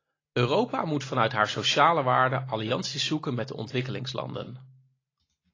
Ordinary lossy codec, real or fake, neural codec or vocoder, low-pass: AAC, 32 kbps; real; none; 7.2 kHz